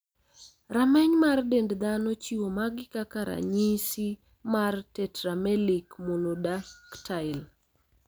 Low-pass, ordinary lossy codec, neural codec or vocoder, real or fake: none; none; none; real